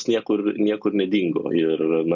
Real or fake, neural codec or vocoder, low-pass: real; none; 7.2 kHz